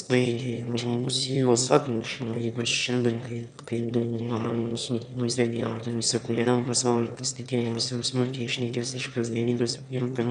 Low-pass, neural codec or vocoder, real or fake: 9.9 kHz; autoencoder, 22.05 kHz, a latent of 192 numbers a frame, VITS, trained on one speaker; fake